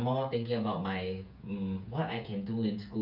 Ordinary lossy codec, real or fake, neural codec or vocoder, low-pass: none; fake; codec, 16 kHz, 8 kbps, FreqCodec, smaller model; 5.4 kHz